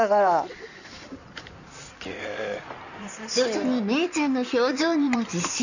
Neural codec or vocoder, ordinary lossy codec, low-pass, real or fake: codec, 16 kHz in and 24 kHz out, 2.2 kbps, FireRedTTS-2 codec; none; 7.2 kHz; fake